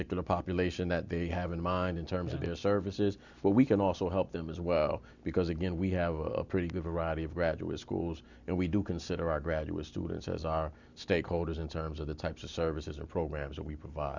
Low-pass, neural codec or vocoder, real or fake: 7.2 kHz; none; real